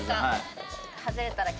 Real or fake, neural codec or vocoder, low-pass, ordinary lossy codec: real; none; none; none